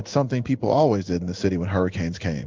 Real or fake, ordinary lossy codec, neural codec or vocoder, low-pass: fake; Opus, 24 kbps; codec, 16 kHz in and 24 kHz out, 1 kbps, XY-Tokenizer; 7.2 kHz